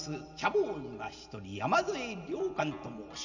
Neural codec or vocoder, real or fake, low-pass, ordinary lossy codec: none; real; 7.2 kHz; none